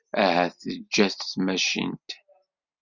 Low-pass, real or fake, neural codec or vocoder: 7.2 kHz; real; none